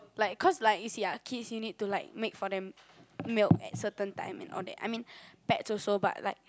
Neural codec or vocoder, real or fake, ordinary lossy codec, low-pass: none; real; none; none